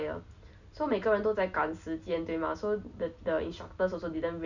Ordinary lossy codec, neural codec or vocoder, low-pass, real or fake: none; none; 7.2 kHz; real